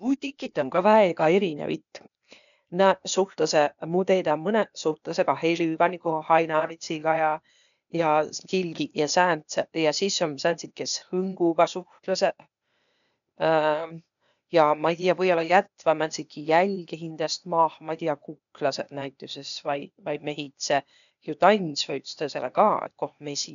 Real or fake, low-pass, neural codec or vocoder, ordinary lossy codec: fake; 7.2 kHz; codec, 16 kHz, 0.8 kbps, ZipCodec; none